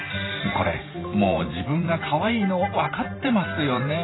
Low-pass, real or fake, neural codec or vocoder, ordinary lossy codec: 7.2 kHz; fake; vocoder, 44.1 kHz, 128 mel bands every 512 samples, BigVGAN v2; AAC, 16 kbps